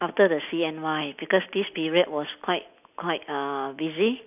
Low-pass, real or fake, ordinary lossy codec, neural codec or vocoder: 3.6 kHz; real; none; none